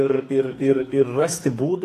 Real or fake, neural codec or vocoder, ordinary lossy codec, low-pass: fake; codec, 44.1 kHz, 2.6 kbps, SNAC; AAC, 48 kbps; 14.4 kHz